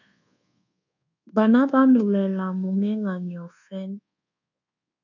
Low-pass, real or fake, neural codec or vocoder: 7.2 kHz; fake; codec, 24 kHz, 1.2 kbps, DualCodec